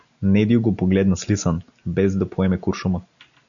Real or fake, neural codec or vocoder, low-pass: real; none; 7.2 kHz